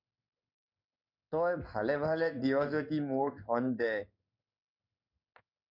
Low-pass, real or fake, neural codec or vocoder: 5.4 kHz; fake; codec, 16 kHz in and 24 kHz out, 1 kbps, XY-Tokenizer